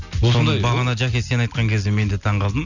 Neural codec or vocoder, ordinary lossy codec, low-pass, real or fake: none; none; 7.2 kHz; real